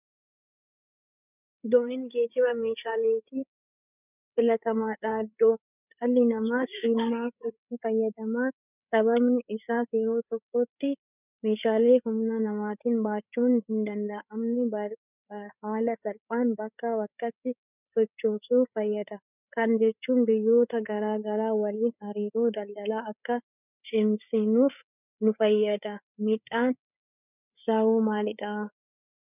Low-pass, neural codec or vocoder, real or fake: 3.6 kHz; codec, 16 kHz, 8 kbps, FreqCodec, larger model; fake